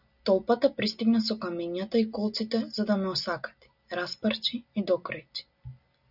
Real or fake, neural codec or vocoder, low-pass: real; none; 5.4 kHz